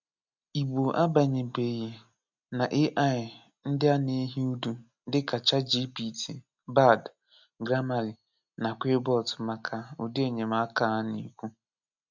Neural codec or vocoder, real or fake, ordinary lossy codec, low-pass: none; real; none; 7.2 kHz